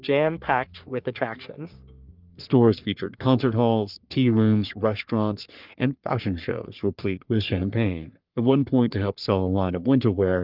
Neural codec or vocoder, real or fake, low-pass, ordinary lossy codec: codec, 44.1 kHz, 3.4 kbps, Pupu-Codec; fake; 5.4 kHz; Opus, 24 kbps